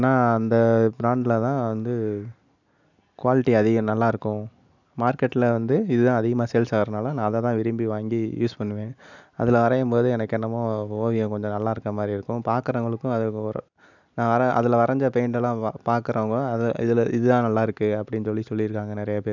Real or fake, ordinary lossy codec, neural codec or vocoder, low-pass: real; none; none; 7.2 kHz